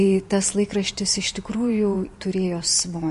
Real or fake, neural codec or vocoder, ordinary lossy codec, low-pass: fake; vocoder, 44.1 kHz, 128 mel bands every 512 samples, BigVGAN v2; MP3, 48 kbps; 14.4 kHz